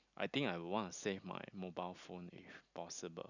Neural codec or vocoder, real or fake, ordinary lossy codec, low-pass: none; real; none; 7.2 kHz